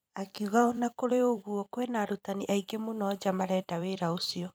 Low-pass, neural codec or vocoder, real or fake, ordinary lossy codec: none; vocoder, 44.1 kHz, 128 mel bands every 256 samples, BigVGAN v2; fake; none